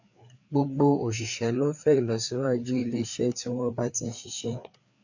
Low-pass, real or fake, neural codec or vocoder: 7.2 kHz; fake; codec, 16 kHz, 4 kbps, FreqCodec, larger model